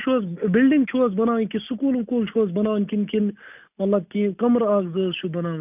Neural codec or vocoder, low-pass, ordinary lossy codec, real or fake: none; 3.6 kHz; none; real